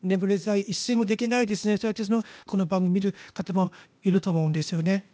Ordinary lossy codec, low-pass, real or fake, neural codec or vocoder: none; none; fake; codec, 16 kHz, 0.8 kbps, ZipCodec